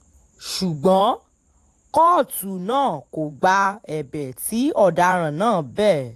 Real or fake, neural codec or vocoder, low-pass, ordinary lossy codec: fake; vocoder, 44.1 kHz, 128 mel bands every 512 samples, BigVGAN v2; 14.4 kHz; AAC, 64 kbps